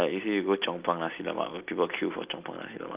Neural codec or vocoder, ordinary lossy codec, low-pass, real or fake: none; Opus, 32 kbps; 3.6 kHz; real